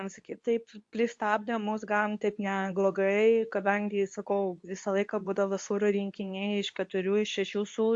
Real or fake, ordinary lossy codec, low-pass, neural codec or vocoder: fake; AAC, 64 kbps; 10.8 kHz; codec, 24 kHz, 0.9 kbps, WavTokenizer, medium speech release version 2